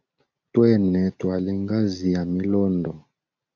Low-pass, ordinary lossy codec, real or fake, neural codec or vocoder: 7.2 kHz; AAC, 48 kbps; real; none